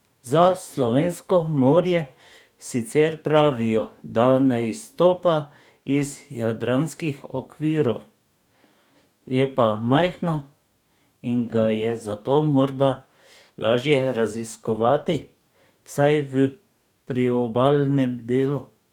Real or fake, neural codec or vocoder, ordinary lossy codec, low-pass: fake; codec, 44.1 kHz, 2.6 kbps, DAC; none; 19.8 kHz